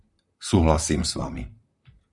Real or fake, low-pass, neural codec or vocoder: fake; 10.8 kHz; vocoder, 44.1 kHz, 128 mel bands, Pupu-Vocoder